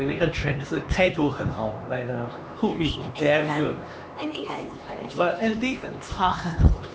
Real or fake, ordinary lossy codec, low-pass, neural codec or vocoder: fake; none; none; codec, 16 kHz, 2 kbps, X-Codec, HuBERT features, trained on LibriSpeech